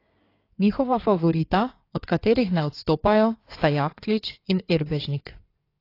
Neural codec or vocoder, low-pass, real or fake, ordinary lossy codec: codec, 16 kHz in and 24 kHz out, 2.2 kbps, FireRedTTS-2 codec; 5.4 kHz; fake; AAC, 32 kbps